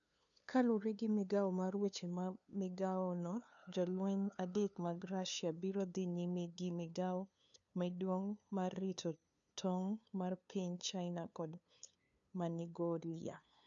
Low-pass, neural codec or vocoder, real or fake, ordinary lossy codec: 7.2 kHz; codec, 16 kHz, 2 kbps, FunCodec, trained on LibriTTS, 25 frames a second; fake; none